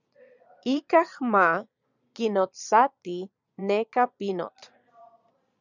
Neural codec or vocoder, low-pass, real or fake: vocoder, 22.05 kHz, 80 mel bands, Vocos; 7.2 kHz; fake